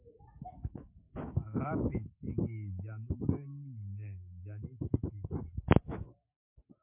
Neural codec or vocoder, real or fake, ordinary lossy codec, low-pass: autoencoder, 48 kHz, 128 numbers a frame, DAC-VAE, trained on Japanese speech; fake; MP3, 32 kbps; 3.6 kHz